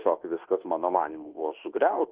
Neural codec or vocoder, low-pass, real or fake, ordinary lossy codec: codec, 24 kHz, 1.2 kbps, DualCodec; 3.6 kHz; fake; Opus, 16 kbps